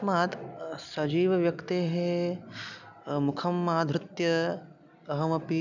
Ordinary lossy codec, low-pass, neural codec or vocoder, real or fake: none; 7.2 kHz; none; real